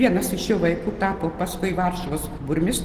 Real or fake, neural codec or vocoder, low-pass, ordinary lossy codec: real; none; 14.4 kHz; Opus, 16 kbps